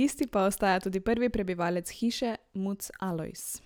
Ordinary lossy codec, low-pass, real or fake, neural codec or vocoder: none; none; real; none